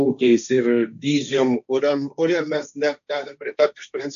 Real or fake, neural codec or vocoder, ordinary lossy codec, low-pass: fake; codec, 16 kHz, 1.1 kbps, Voila-Tokenizer; AAC, 96 kbps; 7.2 kHz